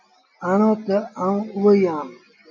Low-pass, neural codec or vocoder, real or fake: 7.2 kHz; none; real